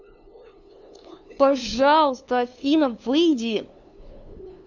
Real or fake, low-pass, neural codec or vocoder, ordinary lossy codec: fake; 7.2 kHz; codec, 16 kHz, 2 kbps, FunCodec, trained on LibriTTS, 25 frames a second; none